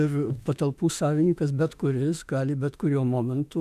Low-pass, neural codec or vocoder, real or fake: 14.4 kHz; autoencoder, 48 kHz, 32 numbers a frame, DAC-VAE, trained on Japanese speech; fake